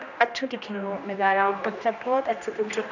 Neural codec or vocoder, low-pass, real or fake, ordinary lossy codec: codec, 16 kHz, 1 kbps, X-Codec, HuBERT features, trained on general audio; 7.2 kHz; fake; none